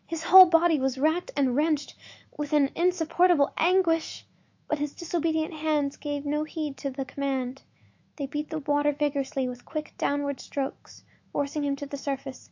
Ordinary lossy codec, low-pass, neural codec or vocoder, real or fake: AAC, 48 kbps; 7.2 kHz; autoencoder, 48 kHz, 128 numbers a frame, DAC-VAE, trained on Japanese speech; fake